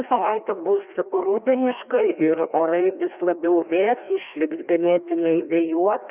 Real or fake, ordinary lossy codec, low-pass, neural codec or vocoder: fake; Opus, 64 kbps; 3.6 kHz; codec, 16 kHz, 1 kbps, FreqCodec, larger model